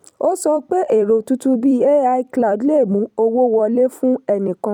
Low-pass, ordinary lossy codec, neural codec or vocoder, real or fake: 19.8 kHz; none; vocoder, 44.1 kHz, 128 mel bands every 256 samples, BigVGAN v2; fake